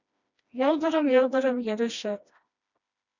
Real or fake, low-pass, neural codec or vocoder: fake; 7.2 kHz; codec, 16 kHz, 1 kbps, FreqCodec, smaller model